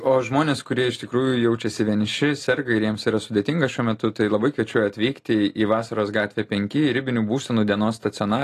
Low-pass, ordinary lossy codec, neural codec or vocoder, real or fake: 14.4 kHz; AAC, 48 kbps; none; real